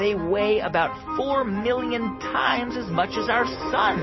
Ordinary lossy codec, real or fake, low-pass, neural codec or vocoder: MP3, 24 kbps; real; 7.2 kHz; none